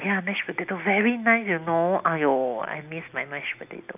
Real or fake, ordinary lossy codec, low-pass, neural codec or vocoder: real; none; 3.6 kHz; none